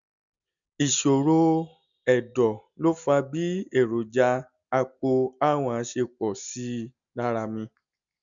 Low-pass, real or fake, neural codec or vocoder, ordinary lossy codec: 7.2 kHz; real; none; none